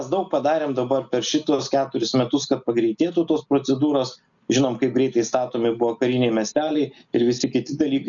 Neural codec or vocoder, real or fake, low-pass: none; real; 7.2 kHz